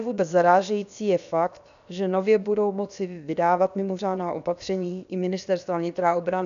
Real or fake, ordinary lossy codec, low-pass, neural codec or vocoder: fake; MP3, 96 kbps; 7.2 kHz; codec, 16 kHz, about 1 kbps, DyCAST, with the encoder's durations